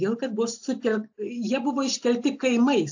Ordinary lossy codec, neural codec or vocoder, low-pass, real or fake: AAC, 48 kbps; none; 7.2 kHz; real